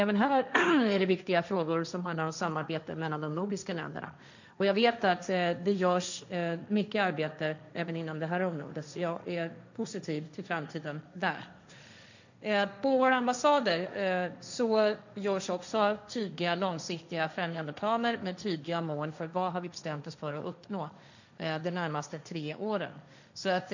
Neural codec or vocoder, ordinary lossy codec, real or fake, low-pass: codec, 16 kHz, 1.1 kbps, Voila-Tokenizer; none; fake; 7.2 kHz